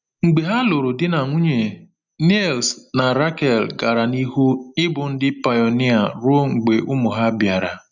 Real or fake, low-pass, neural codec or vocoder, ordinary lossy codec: real; 7.2 kHz; none; none